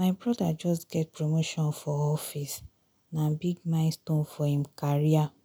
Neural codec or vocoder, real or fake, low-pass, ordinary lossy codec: none; real; none; none